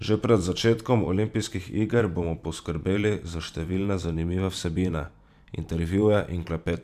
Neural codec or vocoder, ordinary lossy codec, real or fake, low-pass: vocoder, 44.1 kHz, 128 mel bands every 512 samples, BigVGAN v2; none; fake; 14.4 kHz